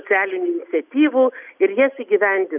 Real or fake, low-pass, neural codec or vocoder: real; 3.6 kHz; none